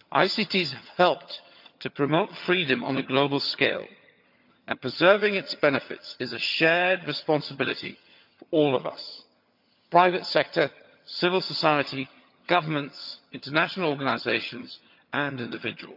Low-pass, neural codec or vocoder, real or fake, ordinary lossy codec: 5.4 kHz; vocoder, 22.05 kHz, 80 mel bands, HiFi-GAN; fake; none